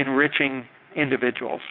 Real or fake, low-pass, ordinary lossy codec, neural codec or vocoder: fake; 5.4 kHz; MP3, 48 kbps; vocoder, 22.05 kHz, 80 mel bands, WaveNeXt